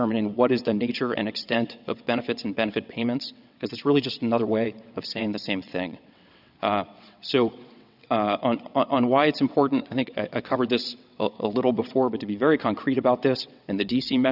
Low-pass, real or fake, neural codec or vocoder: 5.4 kHz; fake; vocoder, 22.05 kHz, 80 mel bands, WaveNeXt